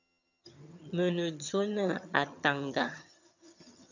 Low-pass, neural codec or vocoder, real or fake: 7.2 kHz; vocoder, 22.05 kHz, 80 mel bands, HiFi-GAN; fake